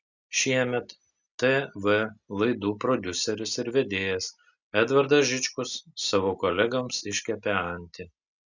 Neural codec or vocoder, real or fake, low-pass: none; real; 7.2 kHz